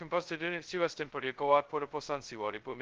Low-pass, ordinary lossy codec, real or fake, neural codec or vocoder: 7.2 kHz; Opus, 16 kbps; fake; codec, 16 kHz, 0.2 kbps, FocalCodec